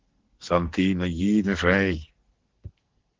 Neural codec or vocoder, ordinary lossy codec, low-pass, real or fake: codec, 44.1 kHz, 2.6 kbps, SNAC; Opus, 16 kbps; 7.2 kHz; fake